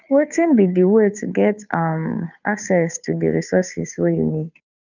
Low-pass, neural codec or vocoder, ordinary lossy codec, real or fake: 7.2 kHz; codec, 16 kHz, 2 kbps, FunCodec, trained on Chinese and English, 25 frames a second; none; fake